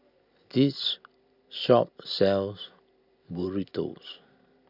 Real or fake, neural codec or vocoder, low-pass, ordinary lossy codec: real; none; 5.4 kHz; none